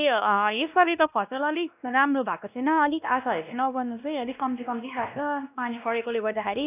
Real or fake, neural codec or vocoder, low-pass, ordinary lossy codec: fake; codec, 16 kHz, 1 kbps, X-Codec, WavLM features, trained on Multilingual LibriSpeech; 3.6 kHz; none